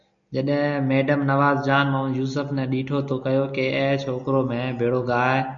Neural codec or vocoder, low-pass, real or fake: none; 7.2 kHz; real